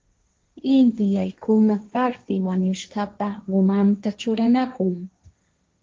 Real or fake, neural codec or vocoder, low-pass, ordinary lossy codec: fake; codec, 16 kHz, 1.1 kbps, Voila-Tokenizer; 7.2 kHz; Opus, 32 kbps